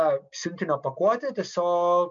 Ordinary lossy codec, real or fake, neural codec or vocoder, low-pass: MP3, 64 kbps; real; none; 7.2 kHz